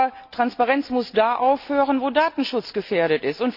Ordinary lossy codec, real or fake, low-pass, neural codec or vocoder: none; real; 5.4 kHz; none